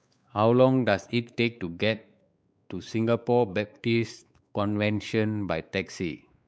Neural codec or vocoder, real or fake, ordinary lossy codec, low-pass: codec, 16 kHz, 4 kbps, X-Codec, WavLM features, trained on Multilingual LibriSpeech; fake; none; none